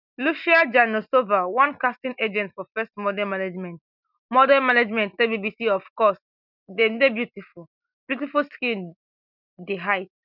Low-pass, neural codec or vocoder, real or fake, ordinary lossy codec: 5.4 kHz; none; real; none